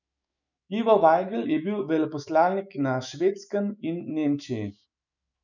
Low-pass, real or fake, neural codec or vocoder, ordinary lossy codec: 7.2 kHz; fake; autoencoder, 48 kHz, 128 numbers a frame, DAC-VAE, trained on Japanese speech; none